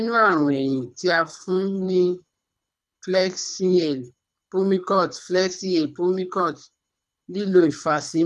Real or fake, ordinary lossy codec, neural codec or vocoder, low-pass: fake; none; codec, 24 kHz, 3 kbps, HILCodec; none